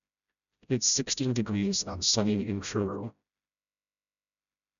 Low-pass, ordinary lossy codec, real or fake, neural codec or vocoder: 7.2 kHz; none; fake; codec, 16 kHz, 0.5 kbps, FreqCodec, smaller model